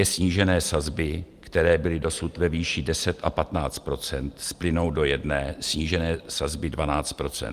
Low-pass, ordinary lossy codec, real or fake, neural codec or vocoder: 14.4 kHz; Opus, 32 kbps; real; none